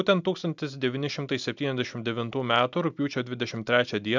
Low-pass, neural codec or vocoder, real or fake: 7.2 kHz; none; real